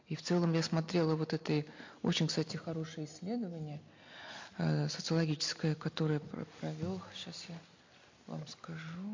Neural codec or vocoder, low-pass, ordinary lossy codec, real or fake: none; 7.2 kHz; MP3, 48 kbps; real